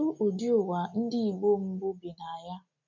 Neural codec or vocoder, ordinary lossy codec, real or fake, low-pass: none; none; real; 7.2 kHz